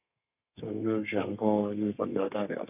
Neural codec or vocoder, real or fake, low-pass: codec, 32 kHz, 1.9 kbps, SNAC; fake; 3.6 kHz